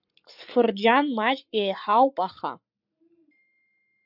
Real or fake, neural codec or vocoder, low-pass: fake; codec, 44.1 kHz, 7.8 kbps, Pupu-Codec; 5.4 kHz